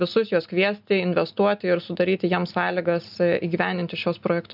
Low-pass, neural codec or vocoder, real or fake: 5.4 kHz; none; real